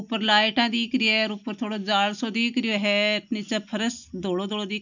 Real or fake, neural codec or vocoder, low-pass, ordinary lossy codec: real; none; 7.2 kHz; none